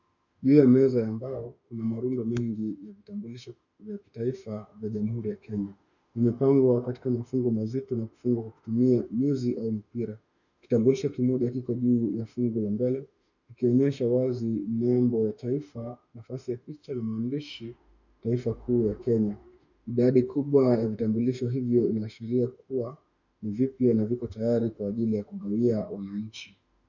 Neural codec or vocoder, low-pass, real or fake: autoencoder, 48 kHz, 32 numbers a frame, DAC-VAE, trained on Japanese speech; 7.2 kHz; fake